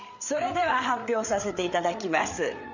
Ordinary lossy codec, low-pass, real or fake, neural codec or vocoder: none; 7.2 kHz; fake; codec, 16 kHz, 8 kbps, FreqCodec, larger model